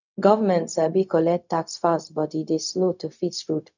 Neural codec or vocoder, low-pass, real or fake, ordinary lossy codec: codec, 16 kHz, 0.4 kbps, LongCat-Audio-Codec; 7.2 kHz; fake; none